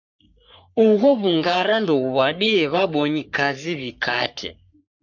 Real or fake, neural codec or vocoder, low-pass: fake; codec, 44.1 kHz, 3.4 kbps, Pupu-Codec; 7.2 kHz